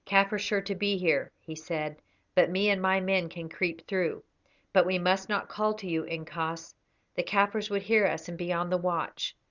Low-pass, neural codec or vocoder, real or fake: 7.2 kHz; none; real